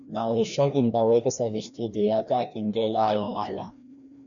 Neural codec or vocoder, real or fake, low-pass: codec, 16 kHz, 1 kbps, FreqCodec, larger model; fake; 7.2 kHz